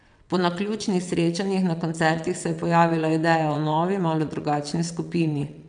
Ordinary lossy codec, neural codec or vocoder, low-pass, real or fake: none; vocoder, 22.05 kHz, 80 mel bands, WaveNeXt; 9.9 kHz; fake